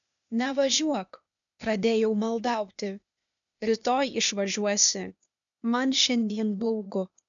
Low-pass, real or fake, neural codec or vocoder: 7.2 kHz; fake; codec, 16 kHz, 0.8 kbps, ZipCodec